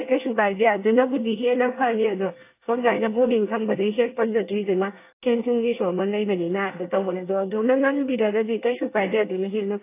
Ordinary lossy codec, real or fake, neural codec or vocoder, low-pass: AAC, 24 kbps; fake; codec, 24 kHz, 1 kbps, SNAC; 3.6 kHz